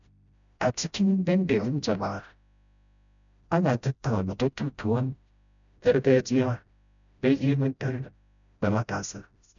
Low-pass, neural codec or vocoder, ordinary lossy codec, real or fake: 7.2 kHz; codec, 16 kHz, 0.5 kbps, FreqCodec, smaller model; none; fake